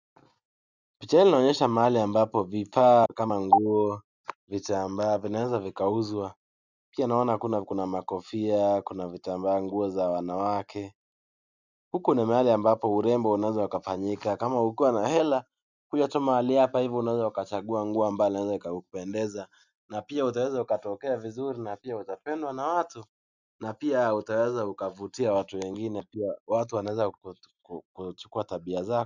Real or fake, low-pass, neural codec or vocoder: real; 7.2 kHz; none